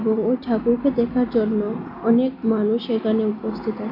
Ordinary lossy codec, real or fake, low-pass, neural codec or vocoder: MP3, 32 kbps; real; 5.4 kHz; none